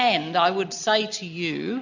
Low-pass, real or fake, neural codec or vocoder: 7.2 kHz; real; none